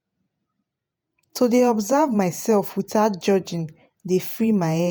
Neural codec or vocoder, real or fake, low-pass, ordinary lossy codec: vocoder, 48 kHz, 128 mel bands, Vocos; fake; none; none